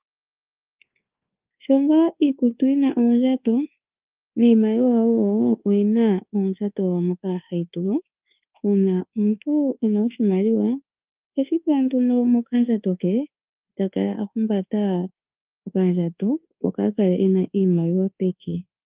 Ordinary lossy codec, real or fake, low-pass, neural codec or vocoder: Opus, 32 kbps; fake; 3.6 kHz; codec, 24 kHz, 1.2 kbps, DualCodec